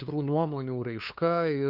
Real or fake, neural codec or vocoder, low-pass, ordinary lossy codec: fake; codec, 16 kHz, 2 kbps, FunCodec, trained on LibriTTS, 25 frames a second; 5.4 kHz; Opus, 64 kbps